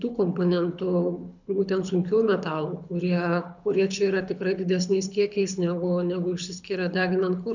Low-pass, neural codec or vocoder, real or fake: 7.2 kHz; codec, 24 kHz, 6 kbps, HILCodec; fake